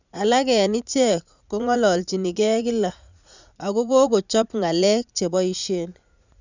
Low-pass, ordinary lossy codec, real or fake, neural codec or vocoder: 7.2 kHz; none; fake; vocoder, 44.1 kHz, 80 mel bands, Vocos